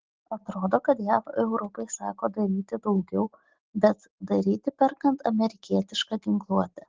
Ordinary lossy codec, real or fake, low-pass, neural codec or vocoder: Opus, 16 kbps; real; 7.2 kHz; none